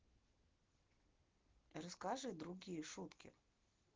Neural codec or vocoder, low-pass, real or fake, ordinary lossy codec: none; 7.2 kHz; real; Opus, 16 kbps